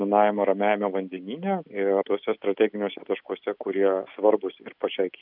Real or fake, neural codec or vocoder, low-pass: real; none; 5.4 kHz